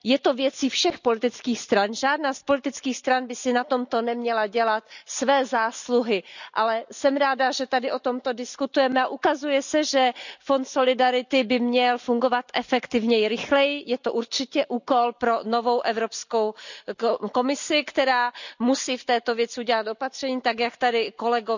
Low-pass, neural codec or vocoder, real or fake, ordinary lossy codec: 7.2 kHz; none; real; none